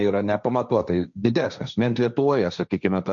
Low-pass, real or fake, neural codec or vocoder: 7.2 kHz; fake; codec, 16 kHz, 1.1 kbps, Voila-Tokenizer